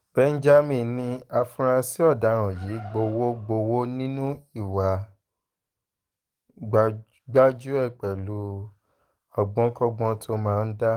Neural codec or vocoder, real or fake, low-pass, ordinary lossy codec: codec, 44.1 kHz, 7.8 kbps, DAC; fake; 19.8 kHz; Opus, 24 kbps